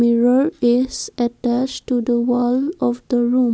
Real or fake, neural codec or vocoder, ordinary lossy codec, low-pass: real; none; none; none